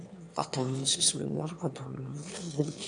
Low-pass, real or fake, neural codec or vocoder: 9.9 kHz; fake; autoencoder, 22.05 kHz, a latent of 192 numbers a frame, VITS, trained on one speaker